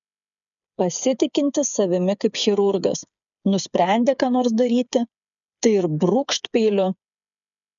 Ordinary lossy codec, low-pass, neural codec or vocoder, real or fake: MP3, 96 kbps; 7.2 kHz; codec, 16 kHz, 8 kbps, FreqCodec, smaller model; fake